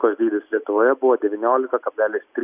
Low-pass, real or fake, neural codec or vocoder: 3.6 kHz; real; none